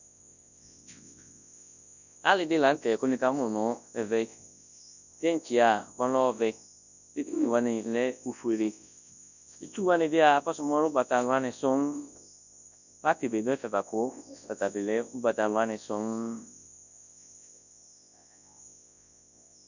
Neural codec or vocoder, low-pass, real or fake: codec, 24 kHz, 0.9 kbps, WavTokenizer, large speech release; 7.2 kHz; fake